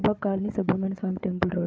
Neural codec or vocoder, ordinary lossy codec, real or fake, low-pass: codec, 16 kHz, 8 kbps, FreqCodec, larger model; none; fake; none